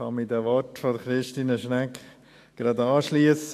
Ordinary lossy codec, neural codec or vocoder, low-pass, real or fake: AAC, 64 kbps; autoencoder, 48 kHz, 128 numbers a frame, DAC-VAE, trained on Japanese speech; 14.4 kHz; fake